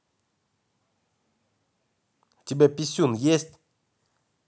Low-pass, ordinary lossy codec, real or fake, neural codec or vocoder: none; none; real; none